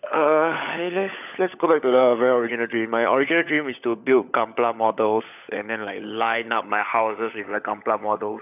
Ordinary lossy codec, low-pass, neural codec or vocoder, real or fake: none; 3.6 kHz; codec, 16 kHz, 16 kbps, FunCodec, trained on LibriTTS, 50 frames a second; fake